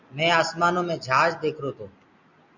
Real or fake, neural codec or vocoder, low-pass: real; none; 7.2 kHz